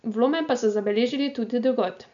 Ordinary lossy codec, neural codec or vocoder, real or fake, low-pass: none; none; real; 7.2 kHz